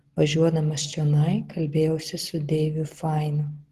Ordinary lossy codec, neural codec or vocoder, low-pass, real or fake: Opus, 16 kbps; none; 14.4 kHz; real